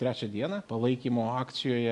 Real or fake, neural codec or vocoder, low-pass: real; none; 10.8 kHz